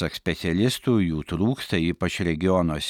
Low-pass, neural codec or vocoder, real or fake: 19.8 kHz; none; real